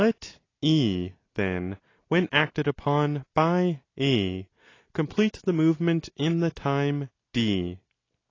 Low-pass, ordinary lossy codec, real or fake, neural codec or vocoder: 7.2 kHz; AAC, 32 kbps; real; none